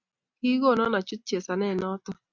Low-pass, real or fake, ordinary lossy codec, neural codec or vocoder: 7.2 kHz; real; Opus, 64 kbps; none